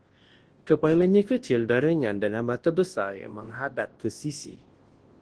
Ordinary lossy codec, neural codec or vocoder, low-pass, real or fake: Opus, 16 kbps; codec, 24 kHz, 0.9 kbps, WavTokenizer, large speech release; 10.8 kHz; fake